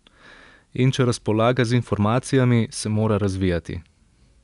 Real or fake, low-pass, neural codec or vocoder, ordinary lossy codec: real; 10.8 kHz; none; none